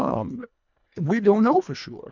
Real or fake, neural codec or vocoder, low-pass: fake; codec, 24 kHz, 1.5 kbps, HILCodec; 7.2 kHz